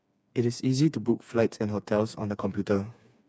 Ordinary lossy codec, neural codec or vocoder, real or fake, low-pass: none; codec, 16 kHz, 4 kbps, FreqCodec, smaller model; fake; none